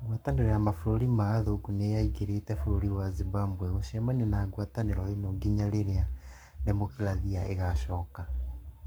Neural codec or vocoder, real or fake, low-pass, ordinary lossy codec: codec, 44.1 kHz, 7.8 kbps, Pupu-Codec; fake; none; none